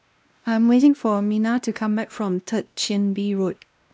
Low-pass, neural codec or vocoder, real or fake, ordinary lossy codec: none; codec, 16 kHz, 1 kbps, X-Codec, WavLM features, trained on Multilingual LibriSpeech; fake; none